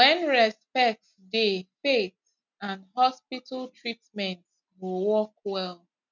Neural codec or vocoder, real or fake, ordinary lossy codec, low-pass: none; real; none; 7.2 kHz